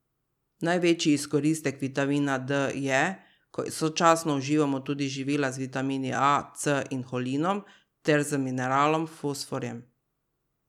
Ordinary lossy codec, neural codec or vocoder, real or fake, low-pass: none; none; real; 19.8 kHz